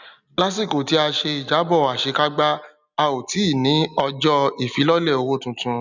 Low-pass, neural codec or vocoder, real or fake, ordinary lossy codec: 7.2 kHz; none; real; none